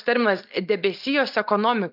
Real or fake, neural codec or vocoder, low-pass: real; none; 5.4 kHz